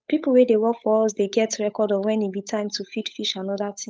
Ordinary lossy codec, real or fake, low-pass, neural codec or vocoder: none; fake; none; codec, 16 kHz, 8 kbps, FunCodec, trained on Chinese and English, 25 frames a second